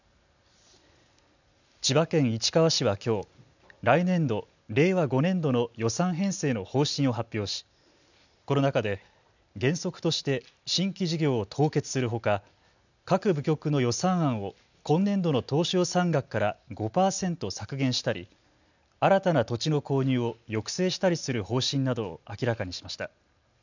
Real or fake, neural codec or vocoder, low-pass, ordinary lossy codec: real; none; 7.2 kHz; none